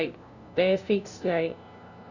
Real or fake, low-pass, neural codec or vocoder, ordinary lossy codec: fake; 7.2 kHz; codec, 16 kHz, 0.5 kbps, FunCodec, trained on LibriTTS, 25 frames a second; none